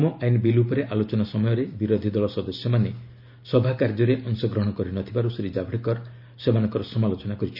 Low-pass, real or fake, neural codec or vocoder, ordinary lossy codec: 5.4 kHz; real; none; none